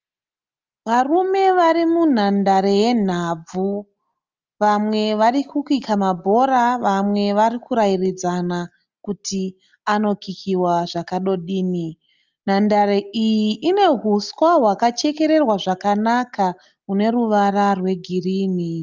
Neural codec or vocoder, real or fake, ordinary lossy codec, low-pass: none; real; Opus, 32 kbps; 7.2 kHz